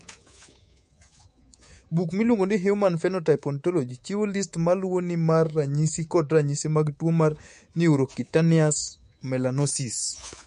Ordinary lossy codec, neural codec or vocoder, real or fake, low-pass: MP3, 48 kbps; codec, 24 kHz, 3.1 kbps, DualCodec; fake; 10.8 kHz